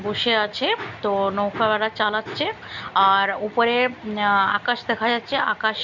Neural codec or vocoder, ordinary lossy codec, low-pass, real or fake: none; none; 7.2 kHz; real